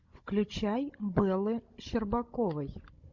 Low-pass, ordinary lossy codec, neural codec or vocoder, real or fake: 7.2 kHz; MP3, 48 kbps; codec, 16 kHz, 16 kbps, FunCodec, trained on Chinese and English, 50 frames a second; fake